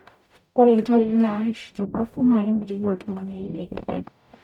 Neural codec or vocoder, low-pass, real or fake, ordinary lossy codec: codec, 44.1 kHz, 0.9 kbps, DAC; 19.8 kHz; fake; none